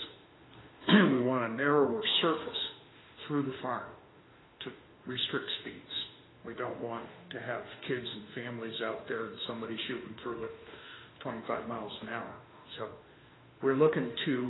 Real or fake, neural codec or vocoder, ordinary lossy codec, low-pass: fake; autoencoder, 48 kHz, 32 numbers a frame, DAC-VAE, trained on Japanese speech; AAC, 16 kbps; 7.2 kHz